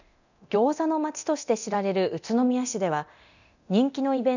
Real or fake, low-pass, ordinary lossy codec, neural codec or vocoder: fake; 7.2 kHz; none; codec, 24 kHz, 0.9 kbps, DualCodec